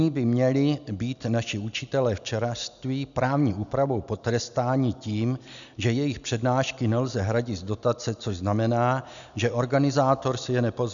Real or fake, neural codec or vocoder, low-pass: real; none; 7.2 kHz